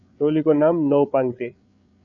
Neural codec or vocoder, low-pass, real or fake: codec, 16 kHz, 8 kbps, FreqCodec, larger model; 7.2 kHz; fake